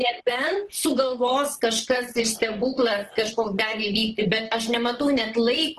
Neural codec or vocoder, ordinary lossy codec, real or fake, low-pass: vocoder, 44.1 kHz, 128 mel bands, Pupu-Vocoder; Opus, 16 kbps; fake; 14.4 kHz